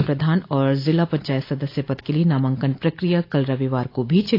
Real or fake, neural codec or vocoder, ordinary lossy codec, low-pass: real; none; AAC, 32 kbps; 5.4 kHz